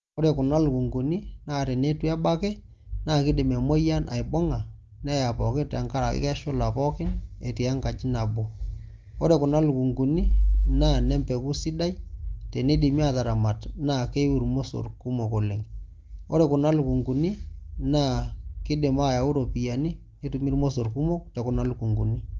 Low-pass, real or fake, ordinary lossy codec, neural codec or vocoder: 7.2 kHz; real; Opus, 32 kbps; none